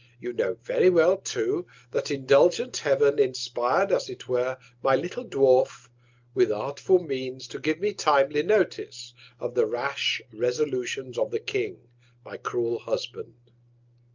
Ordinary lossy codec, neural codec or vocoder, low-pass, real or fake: Opus, 32 kbps; none; 7.2 kHz; real